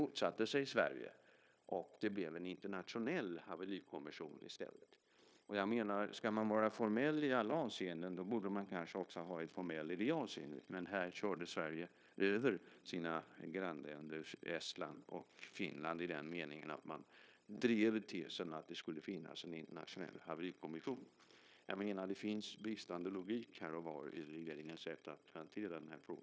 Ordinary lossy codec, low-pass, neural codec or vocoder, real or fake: none; none; codec, 16 kHz, 0.9 kbps, LongCat-Audio-Codec; fake